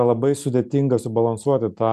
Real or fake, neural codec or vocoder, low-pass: real; none; 14.4 kHz